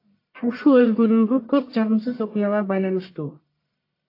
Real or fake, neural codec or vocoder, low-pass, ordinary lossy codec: fake; codec, 44.1 kHz, 1.7 kbps, Pupu-Codec; 5.4 kHz; AAC, 32 kbps